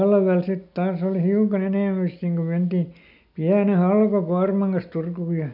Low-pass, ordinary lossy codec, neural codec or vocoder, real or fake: 5.4 kHz; none; none; real